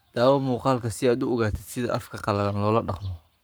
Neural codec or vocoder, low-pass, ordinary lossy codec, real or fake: codec, 44.1 kHz, 7.8 kbps, Pupu-Codec; none; none; fake